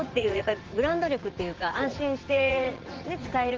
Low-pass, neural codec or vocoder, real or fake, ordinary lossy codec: 7.2 kHz; vocoder, 44.1 kHz, 80 mel bands, Vocos; fake; Opus, 16 kbps